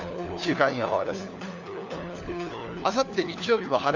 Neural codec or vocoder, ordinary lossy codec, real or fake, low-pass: codec, 16 kHz, 4 kbps, FunCodec, trained on LibriTTS, 50 frames a second; none; fake; 7.2 kHz